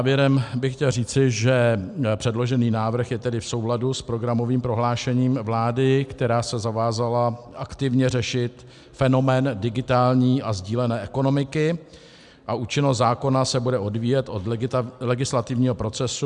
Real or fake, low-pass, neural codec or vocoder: real; 10.8 kHz; none